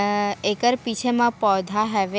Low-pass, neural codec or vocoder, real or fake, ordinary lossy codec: none; none; real; none